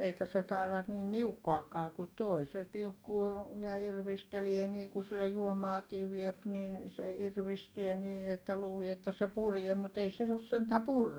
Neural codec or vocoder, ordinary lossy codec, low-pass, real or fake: codec, 44.1 kHz, 2.6 kbps, DAC; none; none; fake